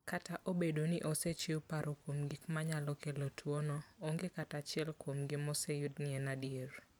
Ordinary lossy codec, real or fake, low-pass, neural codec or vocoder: none; fake; none; vocoder, 44.1 kHz, 128 mel bands every 512 samples, BigVGAN v2